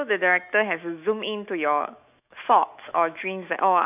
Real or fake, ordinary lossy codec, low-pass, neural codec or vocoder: fake; none; 3.6 kHz; autoencoder, 48 kHz, 128 numbers a frame, DAC-VAE, trained on Japanese speech